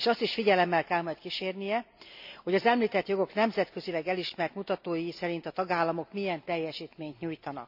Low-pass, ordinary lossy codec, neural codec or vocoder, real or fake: 5.4 kHz; AAC, 48 kbps; none; real